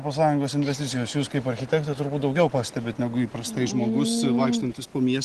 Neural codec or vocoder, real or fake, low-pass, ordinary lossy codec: none; real; 10.8 kHz; Opus, 16 kbps